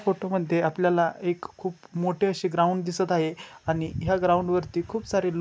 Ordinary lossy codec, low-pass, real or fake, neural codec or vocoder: none; none; real; none